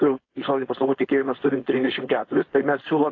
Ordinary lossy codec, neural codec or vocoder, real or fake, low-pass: AAC, 32 kbps; codec, 16 kHz, 4.8 kbps, FACodec; fake; 7.2 kHz